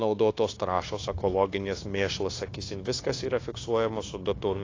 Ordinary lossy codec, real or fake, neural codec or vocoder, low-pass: AAC, 32 kbps; fake; codec, 16 kHz, 0.9 kbps, LongCat-Audio-Codec; 7.2 kHz